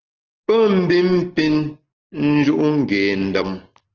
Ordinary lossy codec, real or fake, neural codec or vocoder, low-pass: Opus, 16 kbps; real; none; 7.2 kHz